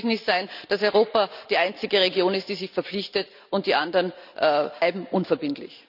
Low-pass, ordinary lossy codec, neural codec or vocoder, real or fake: 5.4 kHz; none; none; real